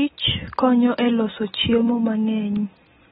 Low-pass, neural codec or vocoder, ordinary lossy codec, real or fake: 19.8 kHz; none; AAC, 16 kbps; real